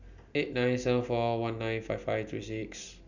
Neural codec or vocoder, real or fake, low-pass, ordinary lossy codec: none; real; 7.2 kHz; none